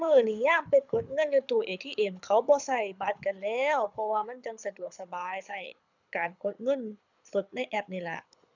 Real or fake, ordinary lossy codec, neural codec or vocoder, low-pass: fake; none; codec, 24 kHz, 6 kbps, HILCodec; 7.2 kHz